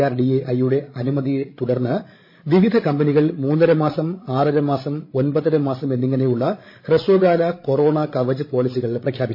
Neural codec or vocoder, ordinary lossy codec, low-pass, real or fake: codec, 16 kHz, 16 kbps, FreqCodec, larger model; MP3, 24 kbps; 5.4 kHz; fake